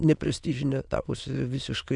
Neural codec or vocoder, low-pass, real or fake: autoencoder, 22.05 kHz, a latent of 192 numbers a frame, VITS, trained on many speakers; 9.9 kHz; fake